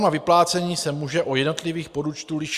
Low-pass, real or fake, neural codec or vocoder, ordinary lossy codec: 14.4 kHz; real; none; Opus, 64 kbps